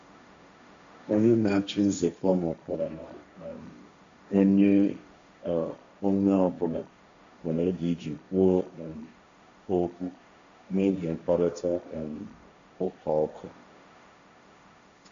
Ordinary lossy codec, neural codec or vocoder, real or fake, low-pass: AAC, 64 kbps; codec, 16 kHz, 1.1 kbps, Voila-Tokenizer; fake; 7.2 kHz